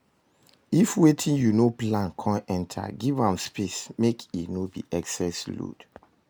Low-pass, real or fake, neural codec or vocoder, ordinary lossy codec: none; real; none; none